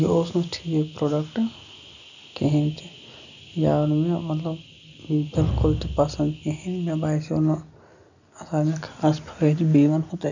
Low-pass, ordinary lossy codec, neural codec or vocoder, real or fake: 7.2 kHz; none; none; real